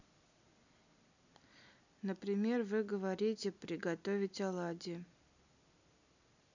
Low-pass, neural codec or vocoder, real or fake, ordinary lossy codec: 7.2 kHz; none; real; none